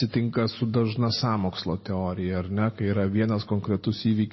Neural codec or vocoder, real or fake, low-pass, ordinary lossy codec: none; real; 7.2 kHz; MP3, 24 kbps